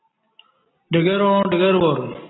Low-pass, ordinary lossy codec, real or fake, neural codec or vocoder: 7.2 kHz; AAC, 16 kbps; real; none